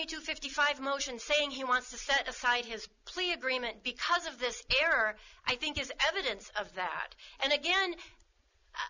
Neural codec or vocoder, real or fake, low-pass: none; real; 7.2 kHz